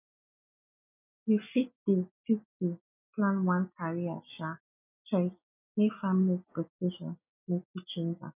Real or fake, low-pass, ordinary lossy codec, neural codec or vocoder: real; 3.6 kHz; none; none